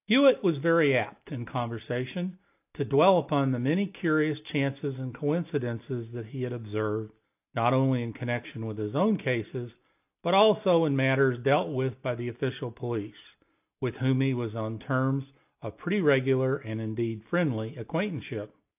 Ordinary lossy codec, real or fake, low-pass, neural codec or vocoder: AAC, 32 kbps; real; 3.6 kHz; none